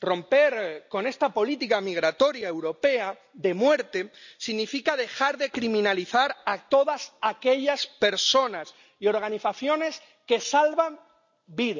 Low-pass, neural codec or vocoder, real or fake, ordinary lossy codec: 7.2 kHz; none; real; none